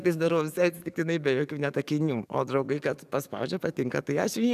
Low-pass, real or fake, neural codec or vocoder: 14.4 kHz; fake; codec, 44.1 kHz, 7.8 kbps, DAC